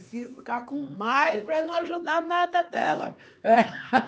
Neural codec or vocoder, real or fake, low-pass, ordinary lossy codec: codec, 16 kHz, 2 kbps, X-Codec, HuBERT features, trained on LibriSpeech; fake; none; none